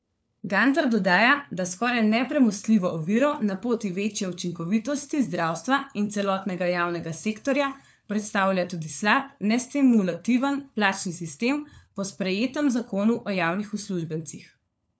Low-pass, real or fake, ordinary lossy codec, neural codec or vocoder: none; fake; none; codec, 16 kHz, 4 kbps, FunCodec, trained on LibriTTS, 50 frames a second